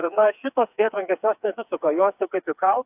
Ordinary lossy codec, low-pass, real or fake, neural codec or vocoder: AAC, 32 kbps; 3.6 kHz; fake; codec, 16 kHz, 4 kbps, FreqCodec, smaller model